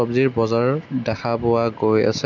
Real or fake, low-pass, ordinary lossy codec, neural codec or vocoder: real; 7.2 kHz; none; none